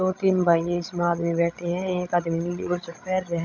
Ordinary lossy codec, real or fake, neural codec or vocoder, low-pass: none; fake; codec, 16 kHz, 16 kbps, FreqCodec, larger model; 7.2 kHz